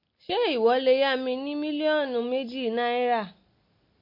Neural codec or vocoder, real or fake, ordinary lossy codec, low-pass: none; real; MP3, 32 kbps; 5.4 kHz